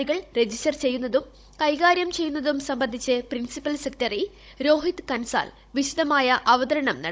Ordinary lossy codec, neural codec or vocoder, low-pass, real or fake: none; codec, 16 kHz, 16 kbps, FunCodec, trained on Chinese and English, 50 frames a second; none; fake